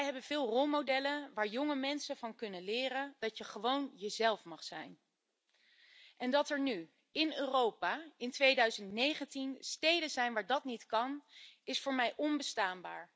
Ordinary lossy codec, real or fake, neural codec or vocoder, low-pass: none; real; none; none